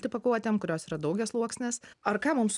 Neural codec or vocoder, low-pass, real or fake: none; 10.8 kHz; real